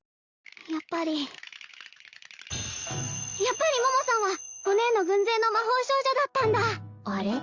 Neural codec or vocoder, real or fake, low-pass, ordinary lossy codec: none; real; 7.2 kHz; Opus, 32 kbps